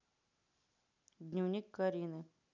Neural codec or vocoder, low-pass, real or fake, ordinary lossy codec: none; 7.2 kHz; real; none